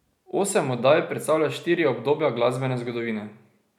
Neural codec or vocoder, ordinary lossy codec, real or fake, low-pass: none; none; real; 19.8 kHz